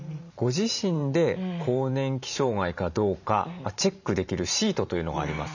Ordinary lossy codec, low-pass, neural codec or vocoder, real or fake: none; 7.2 kHz; vocoder, 44.1 kHz, 128 mel bands every 512 samples, BigVGAN v2; fake